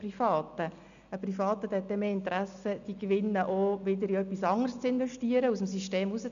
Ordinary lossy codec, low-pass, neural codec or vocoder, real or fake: none; 7.2 kHz; none; real